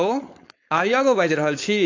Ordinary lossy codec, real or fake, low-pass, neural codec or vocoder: AAC, 48 kbps; fake; 7.2 kHz; codec, 16 kHz, 4.8 kbps, FACodec